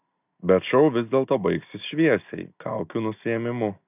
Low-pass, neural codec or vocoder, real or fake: 3.6 kHz; none; real